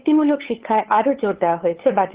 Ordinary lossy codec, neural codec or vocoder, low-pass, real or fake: Opus, 16 kbps; codec, 16 kHz, 8 kbps, FunCodec, trained on LibriTTS, 25 frames a second; 3.6 kHz; fake